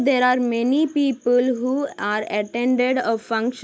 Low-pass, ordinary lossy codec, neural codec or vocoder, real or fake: none; none; none; real